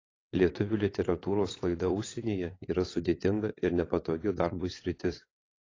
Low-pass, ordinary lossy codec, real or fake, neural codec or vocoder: 7.2 kHz; AAC, 32 kbps; fake; vocoder, 22.05 kHz, 80 mel bands, WaveNeXt